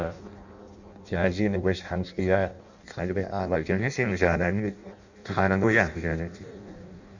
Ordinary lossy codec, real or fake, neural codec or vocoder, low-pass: none; fake; codec, 16 kHz in and 24 kHz out, 0.6 kbps, FireRedTTS-2 codec; 7.2 kHz